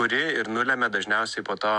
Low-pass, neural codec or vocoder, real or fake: 10.8 kHz; none; real